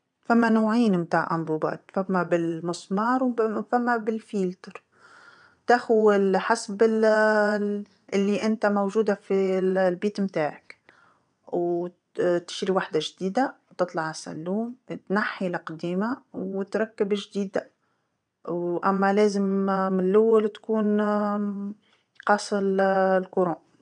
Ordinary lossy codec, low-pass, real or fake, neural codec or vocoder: none; 9.9 kHz; fake; vocoder, 22.05 kHz, 80 mel bands, WaveNeXt